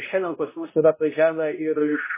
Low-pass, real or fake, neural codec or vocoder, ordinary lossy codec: 3.6 kHz; fake; codec, 16 kHz, 0.5 kbps, X-Codec, HuBERT features, trained on balanced general audio; MP3, 16 kbps